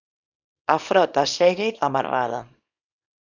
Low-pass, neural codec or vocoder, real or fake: 7.2 kHz; codec, 24 kHz, 0.9 kbps, WavTokenizer, small release; fake